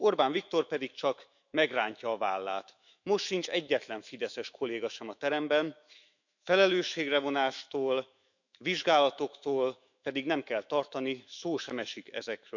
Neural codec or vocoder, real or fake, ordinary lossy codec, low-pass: autoencoder, 48 kHz, 128 numbers a frame, DAC-VAE, trained on Japanese speech; fake; none; 7.2 kHz